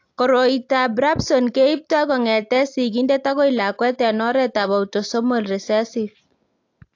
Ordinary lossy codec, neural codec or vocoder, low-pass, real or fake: AAC, 48 kbps; none; 7.2 kHz; real